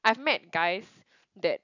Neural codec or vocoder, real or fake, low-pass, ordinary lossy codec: none; real; 7.2 kHz; none